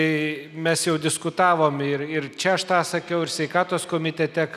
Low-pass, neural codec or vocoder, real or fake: 14.4 kHz; none; real